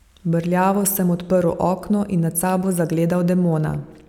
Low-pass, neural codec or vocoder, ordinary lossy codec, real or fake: 19.8 kHz; none; none; real